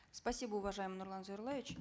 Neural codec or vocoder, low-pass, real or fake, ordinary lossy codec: none; none; real; none